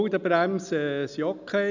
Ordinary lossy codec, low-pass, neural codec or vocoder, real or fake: none; 7.2 kHz; none; real